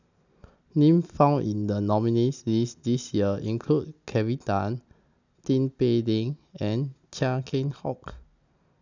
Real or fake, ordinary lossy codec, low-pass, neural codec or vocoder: real; none; 7.2 kHz; none